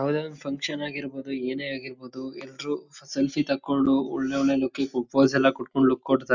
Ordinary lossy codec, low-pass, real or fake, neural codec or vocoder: none; 7.2 kHz; real; none